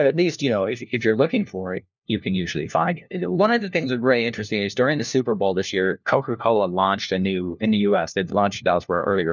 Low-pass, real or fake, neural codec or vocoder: 7.2 kHz; fake; codec, 16 kHz, 1 kbps, FunCodec, trained on LibriTTS, 50 frames a second